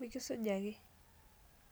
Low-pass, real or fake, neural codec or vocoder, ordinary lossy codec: none; real; none; none